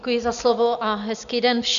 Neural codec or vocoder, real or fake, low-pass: none; real; 7.2 kHz